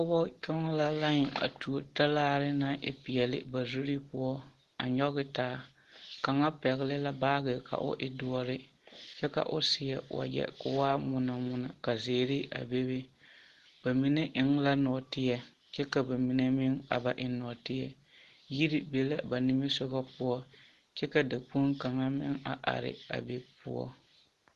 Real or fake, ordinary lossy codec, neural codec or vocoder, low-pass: real; Opus, 16 kbps; none; 14.4 kHz